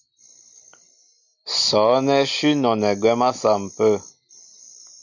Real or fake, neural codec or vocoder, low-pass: real; none; 7.2 kHz